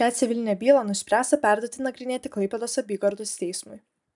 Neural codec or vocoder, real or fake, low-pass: none; real; 10.8 kHz